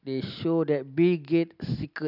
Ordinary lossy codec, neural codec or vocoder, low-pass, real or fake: none; none; 5.4 kHz; real